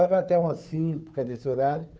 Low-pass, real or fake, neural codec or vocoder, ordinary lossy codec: none; fake; codec, 16 kHz, 2 kbps, FunCodec, trained on Chinese and English, 25 frames a second; none